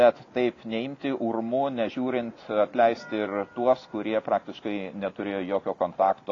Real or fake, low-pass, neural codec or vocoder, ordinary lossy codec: real; 7.2 kHz; none; AAC, 32 kbps